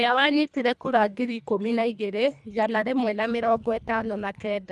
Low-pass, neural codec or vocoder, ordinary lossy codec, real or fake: none; codec, 24 kHz, 1.5 kbps, HILCodec; none; fake